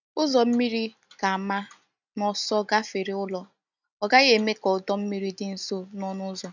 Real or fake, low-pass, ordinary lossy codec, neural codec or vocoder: real; 7.2 kHz; none; none